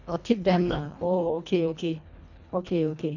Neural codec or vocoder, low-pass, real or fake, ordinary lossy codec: codec, 24 kHz, 1.5 kbps, HILCodec; 7.2 kHz; fake; none